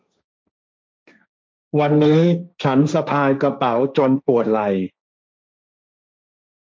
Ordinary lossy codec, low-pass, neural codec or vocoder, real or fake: none; none; codec, 16 kHz, 1.1 kbps, Voila-Tokenizer; fake